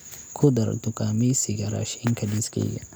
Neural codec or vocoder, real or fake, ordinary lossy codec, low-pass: none; real; none; none